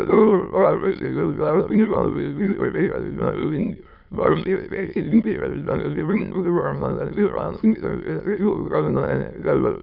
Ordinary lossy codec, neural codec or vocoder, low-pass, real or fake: none; autoencoder, 22.05 kHz, a latent of 192 numbers a frame, VITS, trained on many speakers; 5.4 kHz; fake